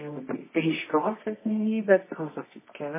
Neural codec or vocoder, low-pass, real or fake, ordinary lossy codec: codec, 24 kHz, 0.9 kbps, WavTokenizer, medium music audio release; 3.6 kHz; fake; MP3, 16 kbps